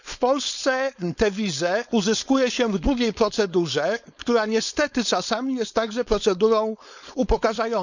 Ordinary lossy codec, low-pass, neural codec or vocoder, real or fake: none; 7.2 kHz; codec, 16 kHz, 4.8 kbps, FACodec; fake